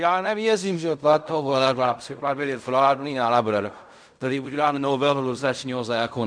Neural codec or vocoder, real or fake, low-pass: codec, 16 kHz in and 24 kHz out, 0.4 kbps, LongCat-Audio-Codec, fine tuned four codebook decoder; fake; 9.9 kHz